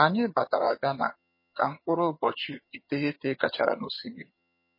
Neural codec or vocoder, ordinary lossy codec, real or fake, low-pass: vocoder, 22.05 kHz, 80 mel bands, HiFi-GAN; MP3, 24 kbps; fake; 5.4 kHz